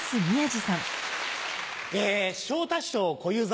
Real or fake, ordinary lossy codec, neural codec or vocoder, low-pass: real; none; none; none